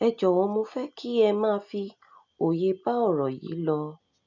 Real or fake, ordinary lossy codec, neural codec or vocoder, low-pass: real; none; none; 7.2 kHz